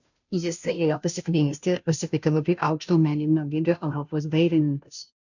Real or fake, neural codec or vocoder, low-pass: fake; codec, 16 kHz, 0.5 kbps, FunCodec, trained on Chinese and English, 25 frames a second; 7.2 kHz